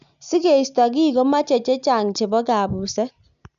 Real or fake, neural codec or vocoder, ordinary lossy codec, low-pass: real; none; none; 7.2 kHz